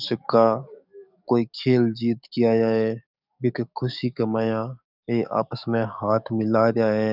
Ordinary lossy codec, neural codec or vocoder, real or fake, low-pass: none; codec, 44.1 kHz, 7.8 kbps, DAC; fake; 5.4 kHz